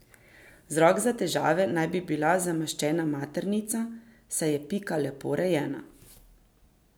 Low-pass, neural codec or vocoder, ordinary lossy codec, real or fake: none; none; none; real